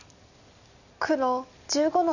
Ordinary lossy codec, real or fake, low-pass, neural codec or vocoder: none; real; 7.2 kHz; none